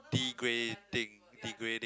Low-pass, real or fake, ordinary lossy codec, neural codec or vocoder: none; real; none; none